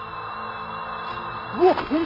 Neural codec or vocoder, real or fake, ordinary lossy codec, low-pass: none; real; MP3, 48 kbps; 5.4 kHz